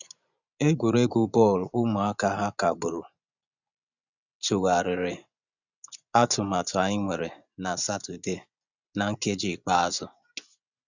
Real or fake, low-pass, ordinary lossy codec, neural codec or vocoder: fake; 7.2 kHz; none; vocoder, 24 kHz, 100 mel bands, Vocos